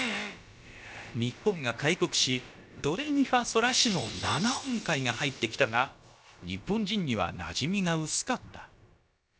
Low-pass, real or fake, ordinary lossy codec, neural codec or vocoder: none; fake; none; codec, 16 kHz, about 1 kbps, DyCAST, with the encoder's durations